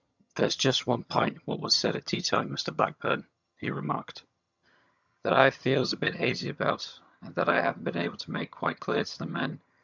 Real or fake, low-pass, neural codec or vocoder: fake; 7.2 kHz; vocoder, 22.05 kHz, 80 mel bands, HiFi-GAN